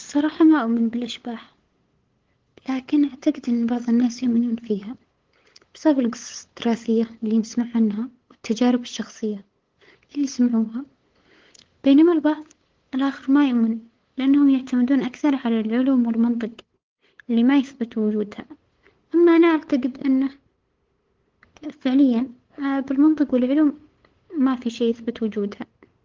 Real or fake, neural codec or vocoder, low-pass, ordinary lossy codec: fake; codec, 16 kHz, 8 kbps, FunCodec, trained on LibriTTS, 25 frames a second; 7.2 kHz; Opus, 16 kbps